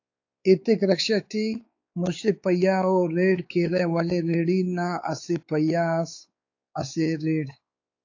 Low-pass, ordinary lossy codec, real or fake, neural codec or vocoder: 7.2 kHz; AAC, 48 kbps; fake; codec, 16 kHz, 4 kbps, X-Codec, WavLM features, trained on Multilingual LibriSpeech